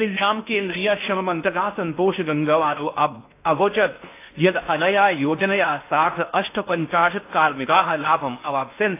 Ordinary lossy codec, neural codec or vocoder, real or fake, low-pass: AAC, 24 kbps; codec, 16 kHz in and 24 kHz out, 0.6 kbps, FocalCodec, streaming, 4096 codes; fake; 3.6 kHz